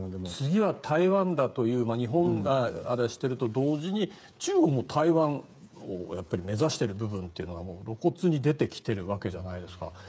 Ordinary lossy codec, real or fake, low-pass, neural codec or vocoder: none; fake; none; codec, 16 kHz, 8 kbps, FreqCodec, smaller model